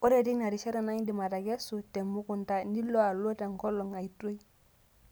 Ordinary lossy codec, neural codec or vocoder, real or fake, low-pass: none; none; real; none